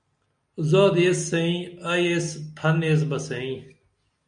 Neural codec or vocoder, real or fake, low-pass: none; real; 9.9 kHz